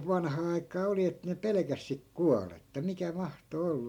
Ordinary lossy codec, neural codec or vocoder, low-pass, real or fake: MP3, 96 kbps; none; 19.8 kHz; real